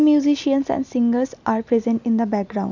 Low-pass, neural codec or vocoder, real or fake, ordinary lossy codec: 7.2 kHz; none; real; none